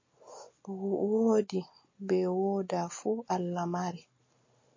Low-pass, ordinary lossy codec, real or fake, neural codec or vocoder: 7.2 kHz; MP3, 32 kbps; real; none